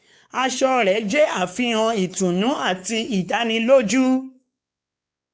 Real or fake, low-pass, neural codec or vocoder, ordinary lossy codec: fake; none; codec, 16 kHz, 4 kbps, X-Codec, WavLM features, trained on Multilingual LibriSpeech; none